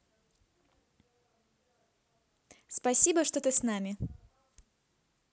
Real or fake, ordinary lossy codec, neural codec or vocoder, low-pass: real; none; none; none